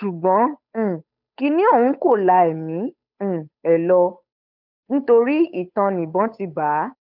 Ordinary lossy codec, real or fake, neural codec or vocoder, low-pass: none; fake; codec, 16 kHz, 8 kbps, FunCodec, trained on Chinese and English, 25 frames a second; 5.4 kHz